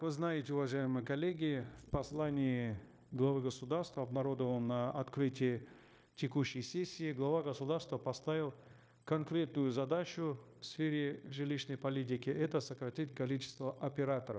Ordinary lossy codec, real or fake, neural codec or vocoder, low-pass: none; fake; codec, 16 kHz, 0.9 kbps, LongCat-Audio-Codec; none